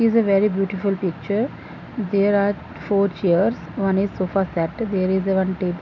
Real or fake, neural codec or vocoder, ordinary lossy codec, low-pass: real; none; none; 7.2 kHz